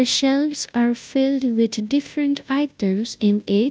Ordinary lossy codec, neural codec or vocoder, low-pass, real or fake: none; codec, 16 kHz, 0.5 kbps, FunCodec, trained on Chinese and English, 25 frames a second; none; fake